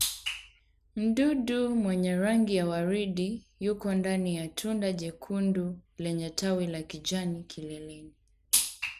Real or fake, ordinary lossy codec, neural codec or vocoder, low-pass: real; none; none; 14.4 kHz